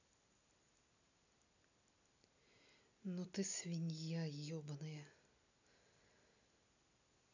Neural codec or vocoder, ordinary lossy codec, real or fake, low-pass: none; none; real; 7.2 kHz